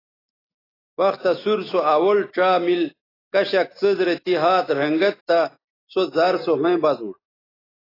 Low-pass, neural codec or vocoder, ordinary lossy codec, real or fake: 5.4 kHz; none; AAC, 24 kbps; real